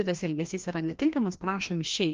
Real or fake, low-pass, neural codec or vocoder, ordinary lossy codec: fake; 7.2 kHz; codec, 16 kHz, 1 kbps, FreqCodec, larger model; Opus, 24 kbps